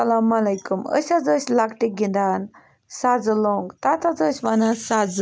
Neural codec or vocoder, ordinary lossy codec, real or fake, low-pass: none; none; real; none